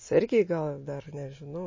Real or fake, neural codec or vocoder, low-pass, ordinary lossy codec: real; none; 7.2 kHz; MP3, 32 kbps